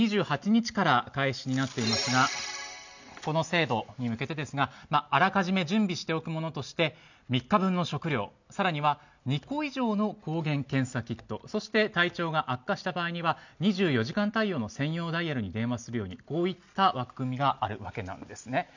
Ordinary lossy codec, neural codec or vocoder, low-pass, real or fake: none; none; 7.2 kHz; real